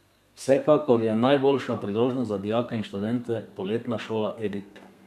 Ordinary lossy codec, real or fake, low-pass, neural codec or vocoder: none; fake; 14.4 kHz; codec, 32 kHz, 1.9 kbps, SNAC